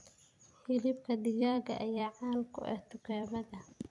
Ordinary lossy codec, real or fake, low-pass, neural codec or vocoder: none; fake; 10.8 kHz; vocoder, 44.1 kHz, 128 mel bands every 256 samples, BigVGAN v2